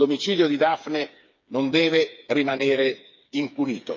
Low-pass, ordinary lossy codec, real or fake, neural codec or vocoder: 7.2 kHz; MP3, 64 kbps; fake; codec, 16 kHz, 4 kbps, FreqCodec, smaller model